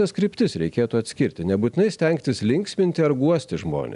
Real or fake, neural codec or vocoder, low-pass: real; none; 10.8 kHz